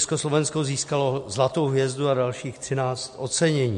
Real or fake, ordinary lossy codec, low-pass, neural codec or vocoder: real; MP3, 48 kbps; 14.4 kHz; none